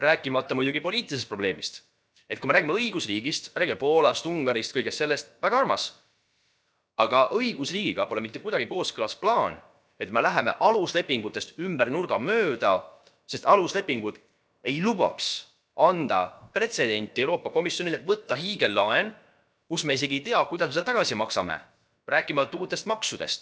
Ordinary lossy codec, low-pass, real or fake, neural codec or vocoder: none; none; fake; codec, 16 kHz, about 1 kbps, DyCAST, with the encoder's durations